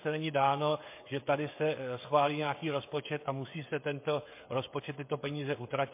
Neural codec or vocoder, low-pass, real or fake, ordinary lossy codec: codec, 16 kHz, 16 kbps, FreqCodec, smaller model; 3.6 kHz; fake; MP3, 24 kbps